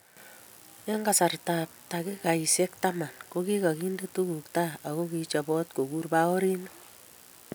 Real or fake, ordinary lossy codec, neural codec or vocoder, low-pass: real; none; none; none